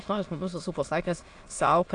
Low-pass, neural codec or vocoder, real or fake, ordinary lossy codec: 9.9 kHz; autoencoder, 22.05 kHz, a latent of 192 numbers a frame, VITS, trained on many speakers; fake; AAC, 48 kbps